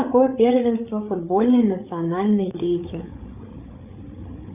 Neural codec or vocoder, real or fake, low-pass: codec, 16 kHz, 8 kbps, FreqCodec, larger model; fake; 3.6 kHz